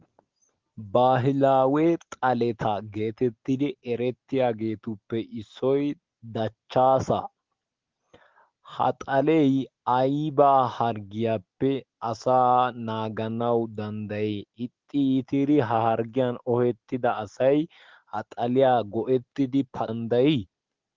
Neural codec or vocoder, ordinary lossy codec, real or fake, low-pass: none; Opus, 16 kbps; real; 7.2 kHz